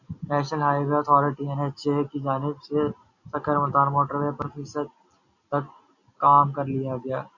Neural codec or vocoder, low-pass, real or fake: none; 7.2 kHz; real